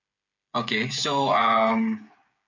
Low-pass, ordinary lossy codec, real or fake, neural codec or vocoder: 7.2 kHz; none; fake; codec, 16 kHz, 8 kbps, FreqCodec, smaller model